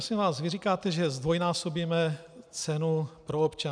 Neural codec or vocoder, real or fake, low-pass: none; real; 9.9 kHz